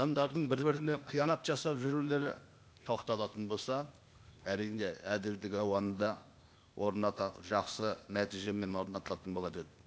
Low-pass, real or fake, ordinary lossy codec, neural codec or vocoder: none; fake; none; codec, 16 kHz, 0.8 kbps, ZipCodec